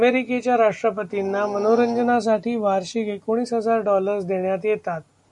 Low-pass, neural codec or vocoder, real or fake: 10.8 kHz; none; real